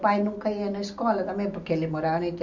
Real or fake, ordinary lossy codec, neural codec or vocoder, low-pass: real; none; none; 7.2 kHz